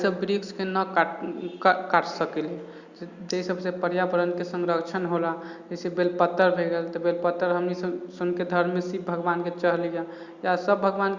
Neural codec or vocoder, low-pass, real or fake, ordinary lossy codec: none; 7.2 kHz; real; Opus, 64 kbps